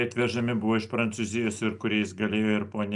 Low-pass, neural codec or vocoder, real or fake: 10.8 kHz; none; real